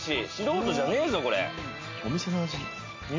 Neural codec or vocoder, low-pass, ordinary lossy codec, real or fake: none; 7.2 kHz; MP3, 48 kbps; real